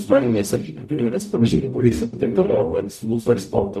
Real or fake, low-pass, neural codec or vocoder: fake; 14.4 kHz; codec, 44.1 kHz, 0.9 kbps, DAC